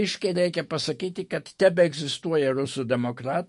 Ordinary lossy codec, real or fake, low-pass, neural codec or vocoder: MP3, 48 kbps; fake; 14.4 kHz; autoencoder, 48 kHz, 128 numbers a frame, DAC-VAE, trained on Japanese speech